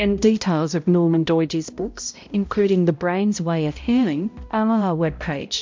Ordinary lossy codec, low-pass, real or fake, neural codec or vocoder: MP3, 64 kbps; 7.2 kHz; fake; codec, 16 kHz, 0.5 kbps, X-Codec, HuBERT features, trained on balanced general audio